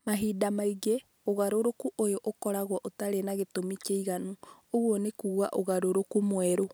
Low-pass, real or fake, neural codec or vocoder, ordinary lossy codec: none; real; none; none